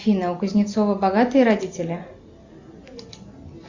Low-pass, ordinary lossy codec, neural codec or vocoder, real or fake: 7.2 kHz; Opus, 64 kbps; none; real